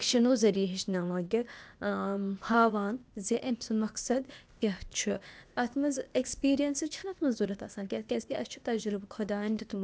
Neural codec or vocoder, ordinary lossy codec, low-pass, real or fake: codec, 16 kHz, 0.8 kbps, ZipCodec; none; none; fake